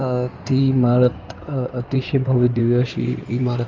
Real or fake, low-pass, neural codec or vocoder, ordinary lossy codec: fake; 7.2 kHz; codec, 16 kHz in and 24 kHz out, 2.2 kbps, FireRedTTS-2 codec; Opus, 32 kbps